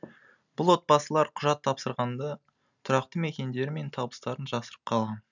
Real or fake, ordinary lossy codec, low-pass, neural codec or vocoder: real; none; 7.2 kHz; none